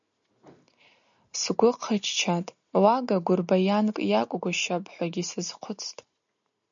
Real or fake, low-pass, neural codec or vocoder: real; 7.2 kHz; none